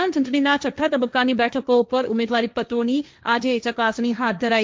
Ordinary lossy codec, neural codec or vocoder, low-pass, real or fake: none; codec, 16 kHz, 1.1 kbps, Voila-Tokenizer; none; fake